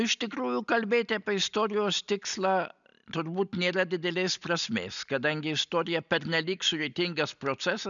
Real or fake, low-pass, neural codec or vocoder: real; 7.2 kHz; none